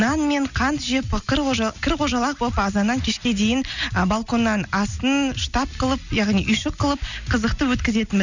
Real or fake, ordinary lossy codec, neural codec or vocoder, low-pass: real; none; none; 7.2 kHz